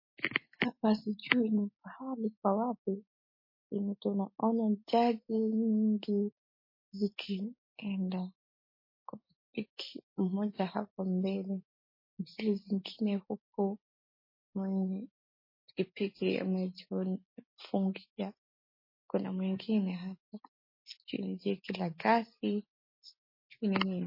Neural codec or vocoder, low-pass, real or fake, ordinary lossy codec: none; 5.4 kHz; real; MP3, 24 kbps